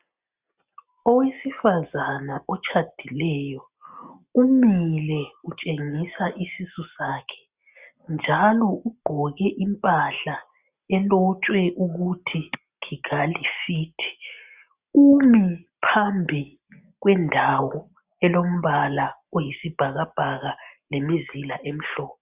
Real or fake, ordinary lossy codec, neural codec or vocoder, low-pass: real; Opus, 64 kbps; none; 3.6 kHz